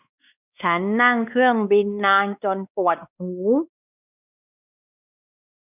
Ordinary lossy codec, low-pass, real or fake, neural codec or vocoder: none; 3.6 kHz; fake; codec, 16 kHz, 2 kbps, X-Codec, WavLM features, trained on Multilingual LibriSpeech